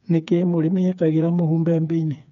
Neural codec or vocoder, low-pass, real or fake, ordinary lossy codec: codec, 16 kHz, 4 kbps, FreqCodec, smaller model; 7.2 kHz; fake; none